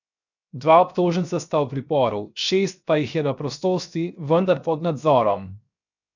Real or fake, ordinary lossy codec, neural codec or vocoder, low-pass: fake; none; codec, 16 kHz, 0.7 kbps, FocalCodec; 7.2 kHz